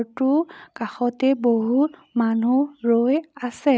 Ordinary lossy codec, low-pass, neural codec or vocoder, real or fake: none; none; none; real